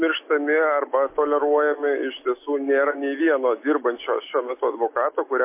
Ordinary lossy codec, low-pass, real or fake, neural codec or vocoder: MP3, 24 kbps; 3.6 kHz; real; none